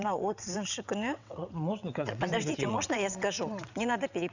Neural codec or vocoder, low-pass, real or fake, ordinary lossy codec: vocoder, 22.05 kHz, 80 mel bands, WaveNeXt; 7.2 kHz; fake; none